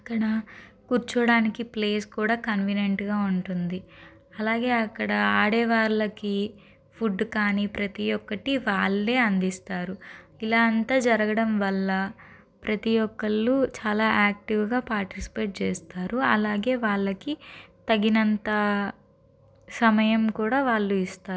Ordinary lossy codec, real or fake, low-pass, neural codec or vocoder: none; real; none; none